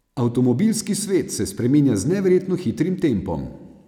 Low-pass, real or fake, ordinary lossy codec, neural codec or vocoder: 19.8 kHz; real; none; none